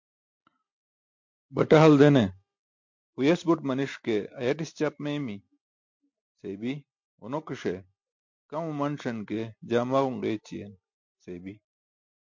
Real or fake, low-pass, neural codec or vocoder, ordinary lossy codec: real; 7.2 kHz; none; MP3, 48 kbps